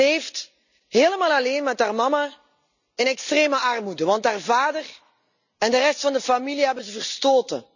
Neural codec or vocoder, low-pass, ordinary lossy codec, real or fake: none; 7.2 kHz; none; real